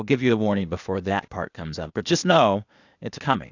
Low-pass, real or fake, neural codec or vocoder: 7.2 kHz; fake; codec, 16 kHz, 0.8 kbps, ZipCodec